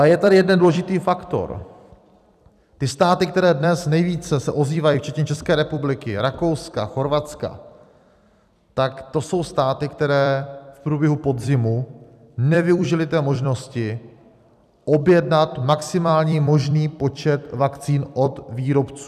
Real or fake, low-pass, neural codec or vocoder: fake; 14.4 kHz; vocoder, 44.1 kHz, 128 mel bands every 256 samples, BigVGAN v2